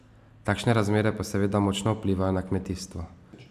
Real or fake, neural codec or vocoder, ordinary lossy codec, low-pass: real; none; AAC, 96 kbps; 14.4 kHz